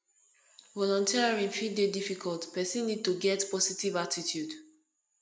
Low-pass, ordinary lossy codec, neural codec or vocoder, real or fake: none; none; none; real